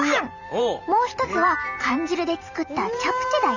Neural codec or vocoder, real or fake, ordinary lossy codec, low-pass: vocoder, 44.1 kHz, 128 mel bands every 512 samples, BigVGAN v2; fake; none; 7.2 kHz